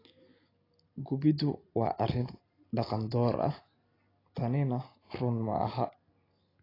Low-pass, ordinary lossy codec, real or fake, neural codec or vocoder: 5.4 kHz; AAC, 24 kbps; real; none